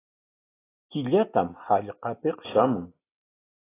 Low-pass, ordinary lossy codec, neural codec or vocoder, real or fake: 3.6 kHz; AAC, 16 kbps; none; real